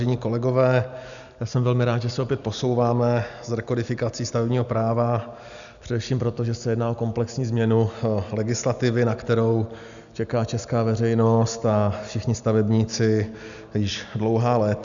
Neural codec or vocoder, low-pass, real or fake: none; 7.2 kHz; real